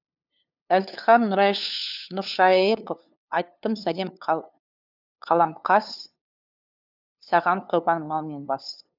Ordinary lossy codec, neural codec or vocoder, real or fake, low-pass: none; codec, 16 kHz, 2 kbps, FunCodec, trained on LibriTTS, 25 frames a second; fake; 5.4 kHz